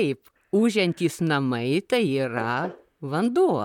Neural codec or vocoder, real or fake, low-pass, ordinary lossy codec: vocoder, 44.1 kHz, 128 mel bands, Pupu-Vocoder; fake; 19.8 kHz; MP3, 96 kbps